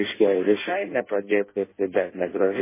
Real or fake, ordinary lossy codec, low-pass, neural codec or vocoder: fake; MP3, 16 kbps; 3.6 kHz; codec, 16 kHz in and 24 kHz out, 0.6 kbps, FireRedTTS-2 codec